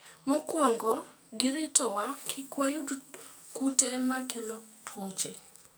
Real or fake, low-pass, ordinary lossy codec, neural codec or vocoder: fake; none; none; codec, 44.1 kHz, 2.6 kbps, SNAC